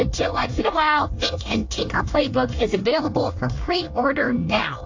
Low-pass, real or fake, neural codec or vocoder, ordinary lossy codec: 7.2 kHz; fake; codec, 24 kHz, 1 kbps, SNAC; AAC, 48 kbps